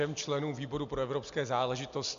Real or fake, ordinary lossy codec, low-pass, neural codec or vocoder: real; MP3, 64 kbps; 7.2 kHz; none